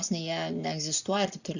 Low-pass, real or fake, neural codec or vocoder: 7.2 kHz; real; none